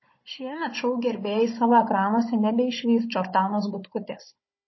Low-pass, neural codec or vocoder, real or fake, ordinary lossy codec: 7.2 kHz; codec, 16 kHz, 16 kbps, FunCodec, trained on Chinese and English, 50 frames a second; fake; MP3, 24 kbps